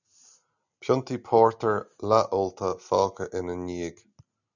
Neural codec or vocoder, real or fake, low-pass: none; real; 7.2 kHz